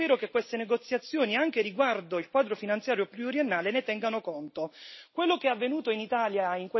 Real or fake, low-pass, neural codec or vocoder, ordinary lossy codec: real; 7.2 kHz; none; MP3, 24 kbps